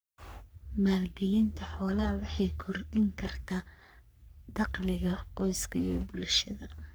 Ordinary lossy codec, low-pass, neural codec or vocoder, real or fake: none; none; codec, 44.1 kHz, 3.4 kbps, Pupu-Codec; fake